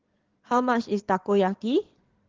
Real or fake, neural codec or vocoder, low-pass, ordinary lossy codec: fake; codec, 16 kHz in and 24 kHz out, 2.2 kbps, FireRedTTS-2 codec; 7.2 kHz; Opus, 16 kbps